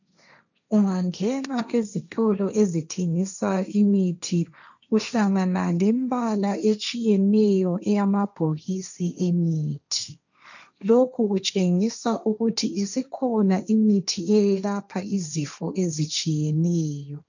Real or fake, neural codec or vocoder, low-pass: fake; codec, 16 kHz, 1.1 kbps, Voila-Tokenizer; 7.2 kHz